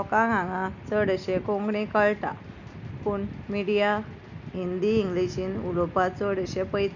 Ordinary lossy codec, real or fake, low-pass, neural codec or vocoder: none; real; 7.2 kHz; none